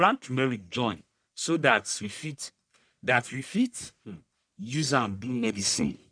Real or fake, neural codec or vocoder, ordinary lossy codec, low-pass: fake; codec, 44.1 kHz, 1.7 kbps, Pupu-Codec; none; 9.9 kHz